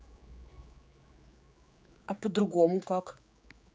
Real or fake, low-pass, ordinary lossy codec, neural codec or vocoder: fake; none; none; codec, 16 kHz, 4 kbps, X-Codec, HuBERT features, trained on general audio